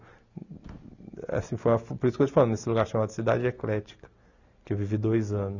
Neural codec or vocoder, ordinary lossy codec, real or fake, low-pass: none; none; real; 7.2 kHz